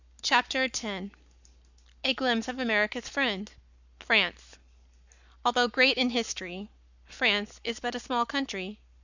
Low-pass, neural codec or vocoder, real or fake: 7.2 kHz; codec, 44.1 kHz, 7.8 kbps, Pupu-Codec; fake